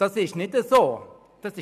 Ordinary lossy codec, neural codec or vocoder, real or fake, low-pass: none; none; real; 14.4 kHz